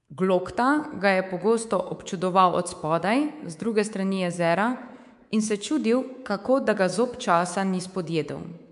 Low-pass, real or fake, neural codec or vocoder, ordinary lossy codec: 10.8 kHz; fake; codec, 24 kHz, 3.1 kbps, DualCodec; MP3, 64 kbps